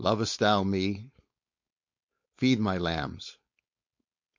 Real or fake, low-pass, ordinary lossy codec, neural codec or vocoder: fake; 7.2 kHz; MP3, 48 kbps; codec, 16 kHz, 4.8 kbps, FACodec